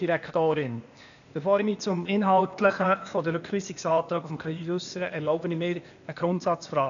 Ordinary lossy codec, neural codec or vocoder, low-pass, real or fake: none; codec, 16 kHz, 0.8 kbps, ZipCodec; 7.2 kHz; fake